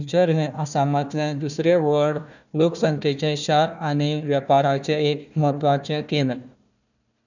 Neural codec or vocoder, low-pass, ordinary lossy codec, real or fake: codec, 16 kHz, 1 kbps, FunCodec, trained on Chinese and English, 50 frames a second; 7.2 kHz; none; fake